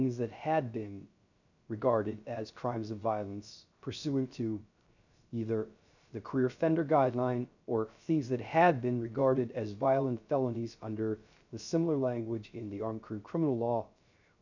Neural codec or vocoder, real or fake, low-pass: codec, 16 kHz, 0.3 kbps, FocalCodec; fake; 7.2 kHz